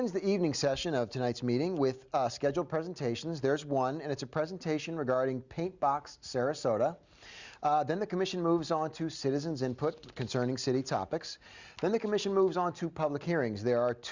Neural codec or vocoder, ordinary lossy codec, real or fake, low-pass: none; Opus, 64 kbps; real; 7.2 kHz